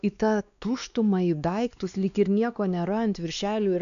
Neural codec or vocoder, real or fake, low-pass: codec, 16 kHz, 2 kbps, X-Codec, WavLM features, trained on Multilingual LibriSpeech; fake; 7.2 kHz